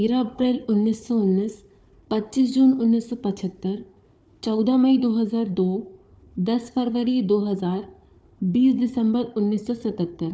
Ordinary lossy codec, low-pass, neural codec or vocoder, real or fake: none; none; codec, 16 kHz, 4 kbps, FunCodec, trained on Chinese and English, 50 frames a second; fake